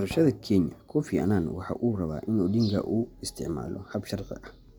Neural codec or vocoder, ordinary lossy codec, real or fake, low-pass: none; none; real; none